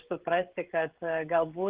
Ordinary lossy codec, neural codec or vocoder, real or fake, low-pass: Opus, 16 kbps; codec, 16 kHz, 16 kbps, FreqCodec, smaller model; fake; 3.6 kHz